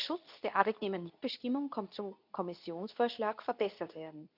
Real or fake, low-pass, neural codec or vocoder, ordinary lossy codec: fake; 5.4 kHz; codec, 24 kHz, 0.9 kbps, WavTokenizer, medium speech release version 2; none